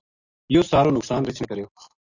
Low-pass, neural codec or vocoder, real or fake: 7.2 kHz; none; real